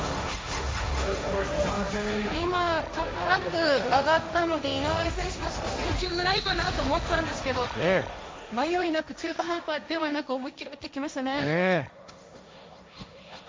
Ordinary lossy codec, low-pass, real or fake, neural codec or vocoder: none; none; fake; codec, 16 kHz, 1.1 kbps, Voila-Tokenizer